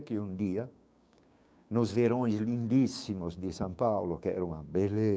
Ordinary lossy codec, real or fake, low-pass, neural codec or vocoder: none; fake; none; codec, 16 kHz, 6 kbps, DAC